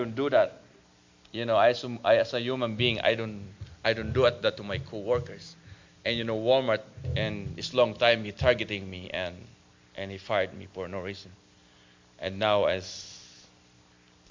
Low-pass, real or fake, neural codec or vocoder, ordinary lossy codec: 7.2 kHz; real; none; MP3, 64 kbps